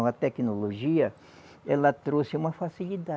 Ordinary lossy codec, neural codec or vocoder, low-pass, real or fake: none; none; none; real